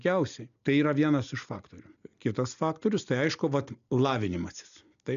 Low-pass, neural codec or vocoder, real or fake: 7.2 kHz; none; real